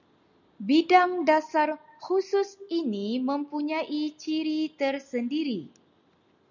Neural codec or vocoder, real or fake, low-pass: none; real; 7.2 kHz